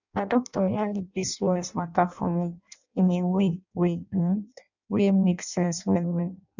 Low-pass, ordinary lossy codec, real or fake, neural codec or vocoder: 7.2 kHz; none; fake; codec, 16 kHz in and 24 kHz out, 0.6 kbps, FireRedTTS-2 codec